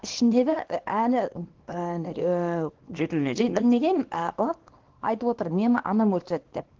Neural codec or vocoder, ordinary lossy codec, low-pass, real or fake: codec, 24 kHz, 0.9 kbps, WavTokenizer, small release; Opus, 16 kbps; 7.2 kHz; fake